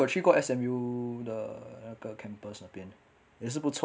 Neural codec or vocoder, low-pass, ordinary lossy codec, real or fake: none; none; none; real